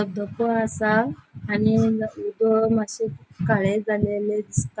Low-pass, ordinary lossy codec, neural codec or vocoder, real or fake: none; none; none; real